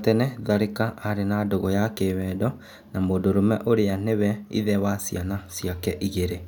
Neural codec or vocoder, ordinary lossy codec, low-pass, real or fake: none; none; 19.8 kHz; real